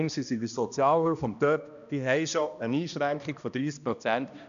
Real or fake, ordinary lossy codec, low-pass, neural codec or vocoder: fake; none; 7.2 kHz; codec, 16 kHz, 1 kbps, X-Codec, HuBERT features, trained on balanced general audio